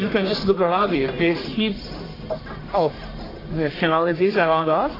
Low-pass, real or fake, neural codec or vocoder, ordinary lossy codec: 5.4 kHz; fake; codec, 44.1 kHz, 1.7 kbps, Pupu-Codec; AAC, 24 kbps